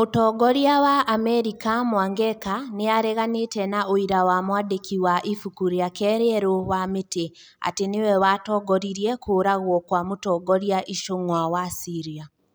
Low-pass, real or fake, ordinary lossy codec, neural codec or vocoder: none; real; none; none